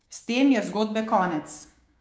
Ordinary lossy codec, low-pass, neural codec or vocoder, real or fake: none; none; codec, 16 kHz, 6 kbps, DAC; fake